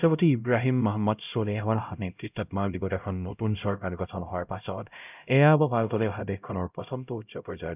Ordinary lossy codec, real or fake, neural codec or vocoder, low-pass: none; fake; codec, 16 kHz, 0.5 kbps, X-Codec, HuBERT features, trained on LibriSpeech; 3.6 kHz